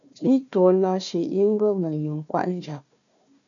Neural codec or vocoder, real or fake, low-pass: codec, 16 kHz, 1 kbps, FunCodec, trained on Chinese and English, 50 frames a second; fake; 7.2 kHz